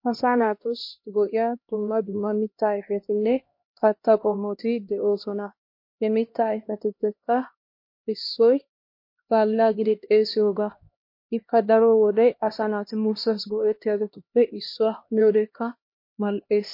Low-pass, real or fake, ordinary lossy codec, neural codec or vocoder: 5.4 kHz; fake; MP3, 32 kbps; codec, 16 kHz, 1 kbps, X-Codec, HuBERT features, trained on LibriSpeech